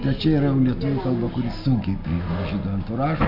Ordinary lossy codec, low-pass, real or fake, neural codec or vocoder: AAC, 48 kbps; 5.4 kHz; real; none